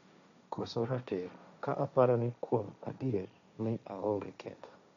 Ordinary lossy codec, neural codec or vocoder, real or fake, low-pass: none; codec, 16 kHz, 1.1 kbps, Voila-Tokenizer; fake; 7.2 kHz